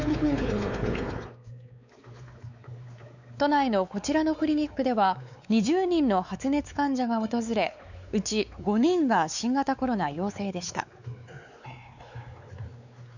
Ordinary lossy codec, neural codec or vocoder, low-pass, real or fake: none; codec, 16 kHz, 4 kbps, X-Codec, WavLM features, trained on Multilingual LibriSpeech; 7.2 kHz; fake